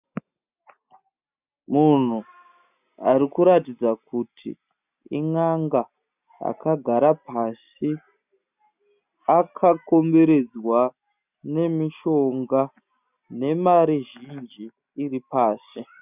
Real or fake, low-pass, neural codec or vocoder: real; 3.6 kHz; none